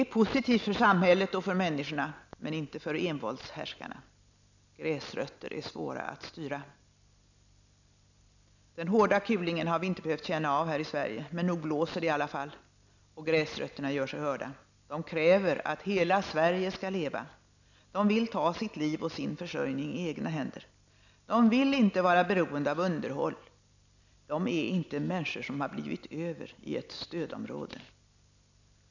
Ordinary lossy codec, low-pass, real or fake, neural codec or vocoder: none; 7.2 kHz; real; none